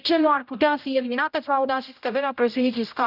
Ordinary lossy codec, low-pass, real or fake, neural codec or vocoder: none; 5.4 kHz; fake; codec, 16 kHz, 0.5 kbps, X-Codec, HuBERT features, trained on general audio